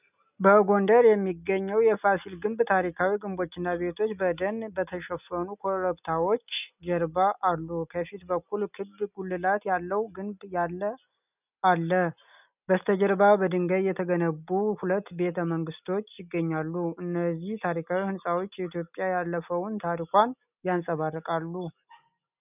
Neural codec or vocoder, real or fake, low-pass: none; real; 3.6 kHz